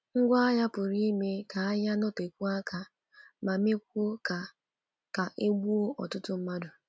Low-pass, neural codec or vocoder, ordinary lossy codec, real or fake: none; none; none; real